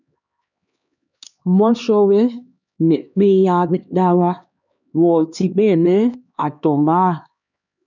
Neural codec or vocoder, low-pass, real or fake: codec, 16 kHz, 2 kbps, X-Codec, HuBERT features, trained on LibriSpeech; 7.2 kHz; fake